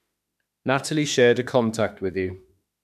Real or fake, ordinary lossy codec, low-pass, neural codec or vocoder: fake; none; 14.4 kHz; autoencoder, 48 kHz, 32 numbers a frame, DAC-VAE, trained on Japanese speech